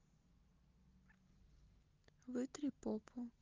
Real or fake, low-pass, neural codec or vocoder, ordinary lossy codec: real; 7.2 kHz; none; Opus, 24 kbps